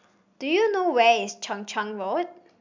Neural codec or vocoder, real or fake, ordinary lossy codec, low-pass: none; real; MP3, 64 kbps; 7.2 kHz